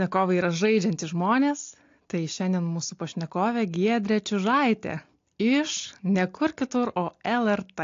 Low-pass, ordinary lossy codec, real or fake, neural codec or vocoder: 7.2 kHz; AAC, 48 kbps; real; none